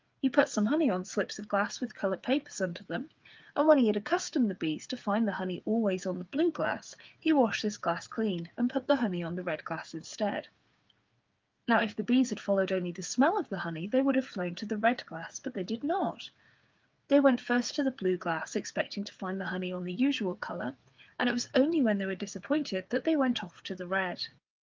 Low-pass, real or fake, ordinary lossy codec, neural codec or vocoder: 7.2 kHz; fake; Opus, 24 kbps; codec, 16 kHz, 8 kbps, FreqCodec, smaller model